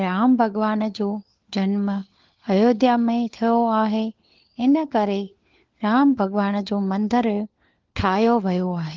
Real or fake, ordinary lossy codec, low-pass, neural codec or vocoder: fake; Opus, 16 kbps; 7.2 kHz; codec, 16 kHz, 4 kbps, FunCodec, trained on LibriTTS, 50 frames a second